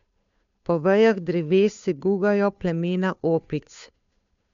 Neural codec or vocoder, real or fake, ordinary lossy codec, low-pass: codec, 16 kHz, 2 kbps, FunCodec, trained on Chinese and English, 25 frames a second; fake; MP3, 64 kbps; 7.2 kHz